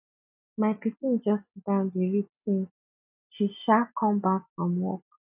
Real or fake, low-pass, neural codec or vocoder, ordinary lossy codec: real; 3.6 kHz; none; none